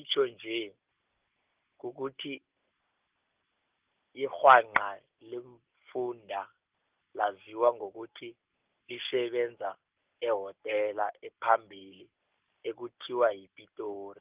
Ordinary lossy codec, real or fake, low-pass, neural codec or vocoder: Opus, 32 kbps; real; 3.6 kHz; none